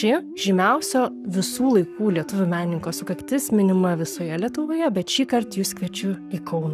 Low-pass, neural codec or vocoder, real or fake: 14.4 kHz; codec, 44.1 kHz, 7.8 kbps, Pupu-Codec; fake